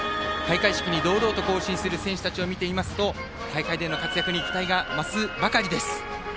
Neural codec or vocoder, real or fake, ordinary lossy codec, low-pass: none; real; none; none